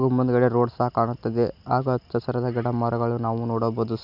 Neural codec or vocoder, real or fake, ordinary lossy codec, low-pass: none; real; none; 5.4 kHz